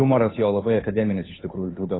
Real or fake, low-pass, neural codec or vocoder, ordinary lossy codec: fake; 7.2 kHz; codec, 16 kHz, 2 kbps, FunCodec, trained on Chinese and English, 25 frames a second; AAC, 16 kbps